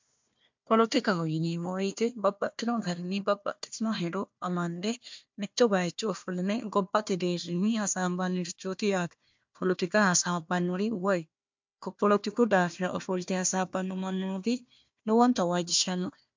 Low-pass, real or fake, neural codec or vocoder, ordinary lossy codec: 7.2 kHz; fake; codec, 16 kHz, 1 kbps, FunCodec, trained on Chinese and English, 50 frames a second; MP3, 64 kbps